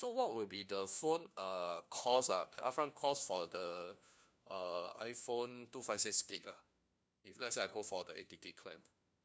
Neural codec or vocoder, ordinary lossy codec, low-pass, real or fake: codec, 16 kHz, 1 kbps, FunCodec, trained on LibriTTS, 50 frames a second; none; none; fake